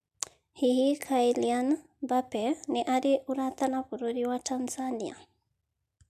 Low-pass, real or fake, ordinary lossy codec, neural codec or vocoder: 14.4 kHz; real; MP3, 96 kbps; none